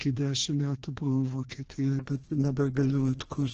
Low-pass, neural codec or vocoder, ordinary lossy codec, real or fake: 7.2 kHz; codec, 16 kHz, 1 kbps, FreqCodec, larger model; Opus, 16 kbps; fake